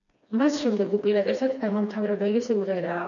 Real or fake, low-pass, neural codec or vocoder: fake; 7.2 kHz; codec, 16 kHz, 2 kbps, FreqCodec, smaller model